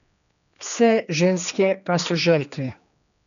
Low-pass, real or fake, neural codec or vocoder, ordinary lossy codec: 7.2 kHz; fake; codec, 16 kHz, 2 kbps, X-Codec, HuBERT features, trained on general audio; none